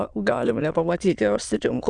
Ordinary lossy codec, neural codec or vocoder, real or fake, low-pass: Opus, 64 kbps; autoencoder, 22.05 kHz, a latent of 192 numbers a frame, VITS, trained on many speakers; fake; 9.9 kHz